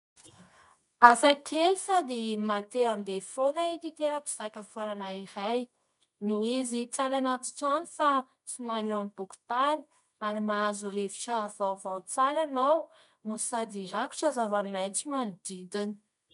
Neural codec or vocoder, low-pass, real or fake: codec, 24 kHz, 0.9 kbps, WavTokenizer, medium music audio release; 10.8 kHz; fake